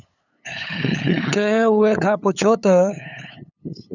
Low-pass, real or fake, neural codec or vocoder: 7.2 kHz; fake; codec, 16 kHz, 8 kbps, FunCodec, trained on LibriTTS, 25 frames a second